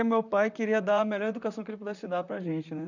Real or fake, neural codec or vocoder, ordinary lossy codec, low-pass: fake; vocoder, 44.1 kHz, 128 mel bands, Pupu-Vocoder; none; 7.2 kHz